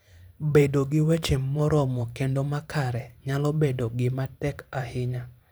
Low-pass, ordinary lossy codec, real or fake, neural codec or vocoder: none; none; fake; vocoder, 44.1 kHz, 128 mel bands every 512 samples, BigVGAN v2